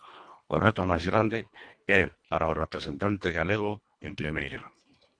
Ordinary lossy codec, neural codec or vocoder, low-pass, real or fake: AAC, 64 kbps; codec, 24 kHz, 1.5 kbps, HILCodec; 9.9 kHz; fake